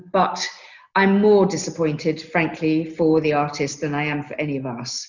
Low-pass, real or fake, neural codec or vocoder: 7.2 kHz; real; none